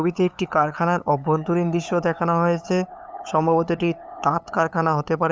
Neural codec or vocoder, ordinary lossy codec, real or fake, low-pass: codec, 16 kHz, 8 kbps, FunCodec, trained on LibriTTS, 25 frames a second; none; fake; none